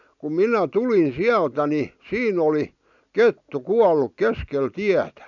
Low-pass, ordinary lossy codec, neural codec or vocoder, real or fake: 7.2 kHz; none; none; real